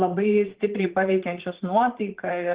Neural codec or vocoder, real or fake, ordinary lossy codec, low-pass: vocoder, 44.1 kHz, 80 mel bands, Vocos; fake; Opus, 16 kbps; 3.6 kHz